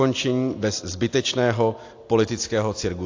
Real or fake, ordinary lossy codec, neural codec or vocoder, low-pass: real; AAC, 48 kbps; none; 7.2 kHz